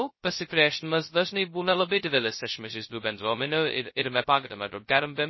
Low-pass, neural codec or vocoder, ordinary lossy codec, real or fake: 7.2 kHz; codec, 16 kHz, 0.2 kbps, FocalCodec; MP3, 24 kbps; fake